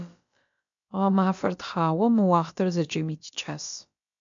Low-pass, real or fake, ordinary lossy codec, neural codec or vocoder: 7.2 kHz; fake; MP3, 64 kbps; codec, 16 kHz, about 1 kbps, DyCAST, with the encoder's durations